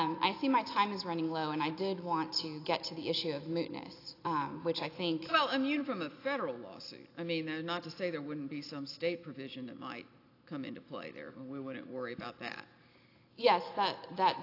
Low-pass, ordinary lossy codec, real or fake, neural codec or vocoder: 5.4 kHz; AAC, 32 kbps; real; none